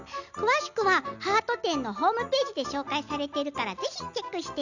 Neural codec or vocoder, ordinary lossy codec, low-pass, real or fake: none; none; 7.2 kHz; real